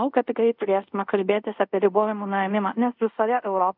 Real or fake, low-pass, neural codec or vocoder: fake; 5.4 kHz; codec, 24 kHz, 0.5 kbps, DualCodec